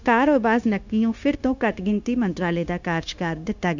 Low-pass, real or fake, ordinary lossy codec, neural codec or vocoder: 7.2 kHz; fake; none; codec, 16 kHz, 0.9 kbps, LongCat-Audio-Codec